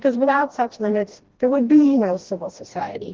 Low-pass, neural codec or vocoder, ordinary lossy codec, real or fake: 7.2 kHz; codec, 16 kHz, 1 kbps, FreqCodec, smaller model; Opus, 16 kbps; fake